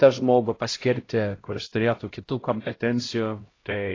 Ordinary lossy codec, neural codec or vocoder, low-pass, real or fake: AAC, 32 kbps; codec, 16 kHz, 0.5 kbps, X-Codec, HuBERT features, trained on LibriSpeech; 7.2 kHz; fake